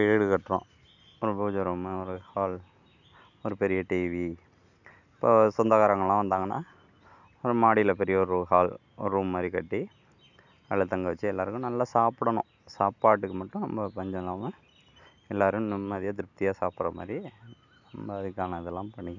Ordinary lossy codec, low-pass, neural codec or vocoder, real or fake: none; 7.2 kHz; none; real